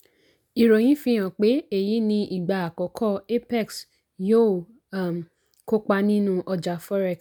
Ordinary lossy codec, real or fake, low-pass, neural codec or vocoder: none; real; none; none